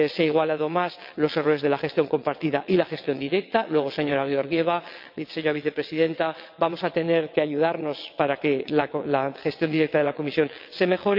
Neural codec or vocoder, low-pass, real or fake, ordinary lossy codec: vocoder, 22.05 kHz, 80 mel bands, WaveNeXt; 5.4 kHz; fake; MP3, 48 kbps